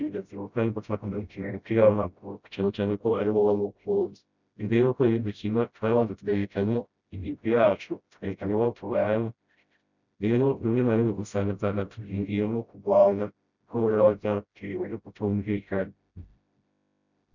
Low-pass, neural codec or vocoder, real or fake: 7.2 kHz; codec, 16 kHz, 0.5 kbps, FreqCodec, smaller model; fake